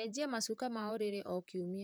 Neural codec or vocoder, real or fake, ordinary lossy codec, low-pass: vocoder, 44.1 kHz, 128 mel bands, Pupu-Vocoder; fake; none; none